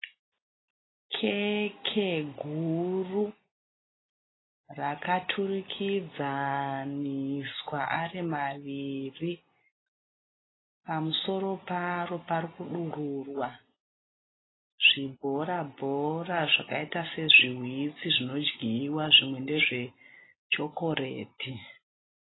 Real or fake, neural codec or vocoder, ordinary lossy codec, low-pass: real; none; AAC, 16 kbps; 7.2 kHz